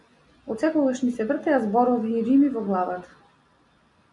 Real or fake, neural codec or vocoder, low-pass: real; none; 10.8 kHz